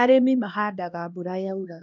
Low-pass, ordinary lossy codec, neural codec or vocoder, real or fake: 7.2 kHz; none; codec, 16 kHz, 1 kbps, X-Codec, HuBERT features, trained on LibriSpeech; fake